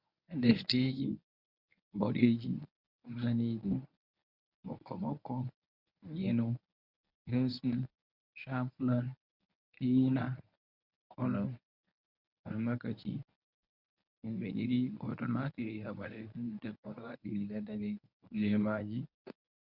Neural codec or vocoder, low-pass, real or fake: codec, 24 kHz, 0.9 kbps, WavTokenizer, medium speech release version 1; 5.4 kHz; fake